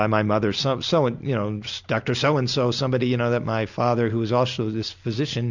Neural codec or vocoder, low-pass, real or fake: none; 7.2 kHz; real